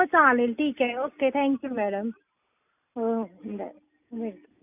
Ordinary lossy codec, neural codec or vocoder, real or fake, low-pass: none; none; real; 3.6 kHz